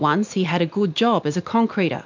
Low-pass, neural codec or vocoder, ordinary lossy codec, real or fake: 7.2 kHz; none; MP3, 64 kbps; real